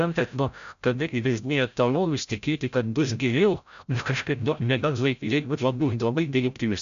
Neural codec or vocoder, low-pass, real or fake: codec, 16 kHz, 0.5 kbps, FreqCodec, larger model; 7.2 kHz; fake